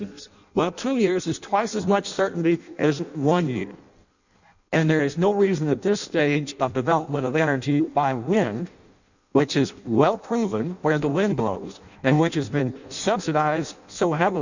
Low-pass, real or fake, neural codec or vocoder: 7.2 kHz; fake; codec, 16 kHz in and 24 kHz out, 0.6 kbps, FireRedTTS-2 codec